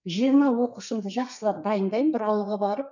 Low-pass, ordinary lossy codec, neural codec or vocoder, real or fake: 7.2 kHz; none; codec, 32 kHz, 1.9 kbps, SNAC; fake